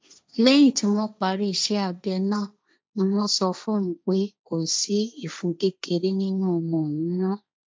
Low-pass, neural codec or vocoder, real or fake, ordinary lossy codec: none; codec, 16 kHz, 1.1 kbps, Voila-Tokenizer; fake; none